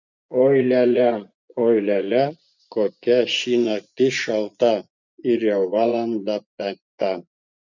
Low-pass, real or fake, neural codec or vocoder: 7.2 kHz; fake; vocoder, 24 kHz, 100 mel bands, Vocos